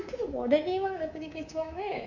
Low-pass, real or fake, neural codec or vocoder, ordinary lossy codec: 7.2 kHz; fake; codec, 24 kHz, 3.1 kbps, DualCodec; none